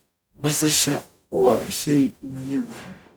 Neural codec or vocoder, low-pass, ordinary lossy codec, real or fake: codec, 44.1 kHz, 0.9 kbps, DAC; none; none; fake